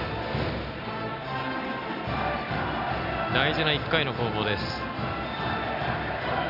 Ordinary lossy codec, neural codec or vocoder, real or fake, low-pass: none; none; real; 5.4 kHz